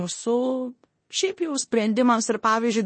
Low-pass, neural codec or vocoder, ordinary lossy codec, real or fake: 9.9 kHz; codec, 16 kHz in and 24 kHz out, 0.9 kbps, LongCat-Audio-Codec, four codebook decoder; MP3, 32 kbps; fake